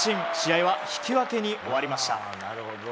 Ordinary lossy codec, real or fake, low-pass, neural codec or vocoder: none; real; none; none